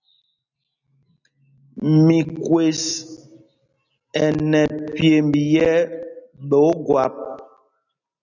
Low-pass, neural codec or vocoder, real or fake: 7.2 kHz; none; real